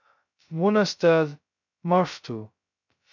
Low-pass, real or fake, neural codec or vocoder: 7.2 kHz; fake; codec, 16 kHz, 0.2 kbps, FocalCodec